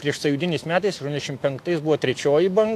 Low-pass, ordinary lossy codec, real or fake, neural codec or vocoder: 14.4 kHz; AAC, 64 kbps; fake; autoencoder, 48 kHz, 128 numbers a frame, DAC-VAE, trained on Japanese speech